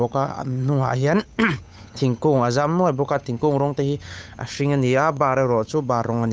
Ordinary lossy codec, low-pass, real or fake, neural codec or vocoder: none; none; fake; codec, 16 kHz, 8 kbps, FunCodec, trained on Chinese and English, 25 frames a second